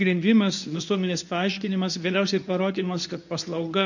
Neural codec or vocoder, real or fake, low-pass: codec, 24 kHz, 0.9 kbps, WavTokenizer, medium speech release version 2; fake; 7.2 kHz